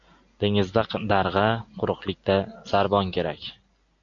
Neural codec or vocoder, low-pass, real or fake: none; 7.2 kHz; real